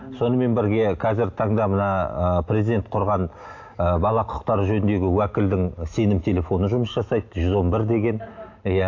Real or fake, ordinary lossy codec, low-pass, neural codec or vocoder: real; AAC, 48 kbps; 7.2 kHz; none